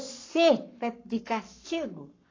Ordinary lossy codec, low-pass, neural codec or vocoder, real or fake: AAC, 32 kbps; 7.2 kHz; codec, 44.1 kHz, 7.8 kbps, Pupu-Codec; fake